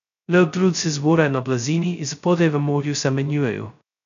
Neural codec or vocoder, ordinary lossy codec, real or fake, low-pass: codec, 16 kHz, 0.2 kbps, FocalCodec; none; fake; 7.2 kHz